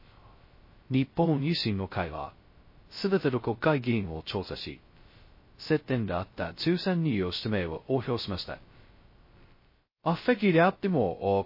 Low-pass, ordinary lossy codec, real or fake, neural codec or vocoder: 5.4 kHz; MP3, 24 kbps; fake; codec, 16 kHz, 0.2 kbps, FocalCodec